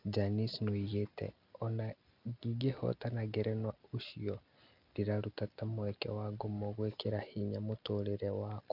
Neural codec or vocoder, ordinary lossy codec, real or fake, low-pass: none; none; real; 5.4 kHz